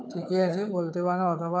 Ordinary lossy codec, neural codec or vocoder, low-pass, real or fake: none; codec, 16 kHz, 4 kbps, FunCodec, trained on Chinese and English, 50 frames a second; none; fake